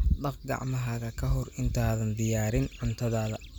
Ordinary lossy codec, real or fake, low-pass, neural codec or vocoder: none; real; none; none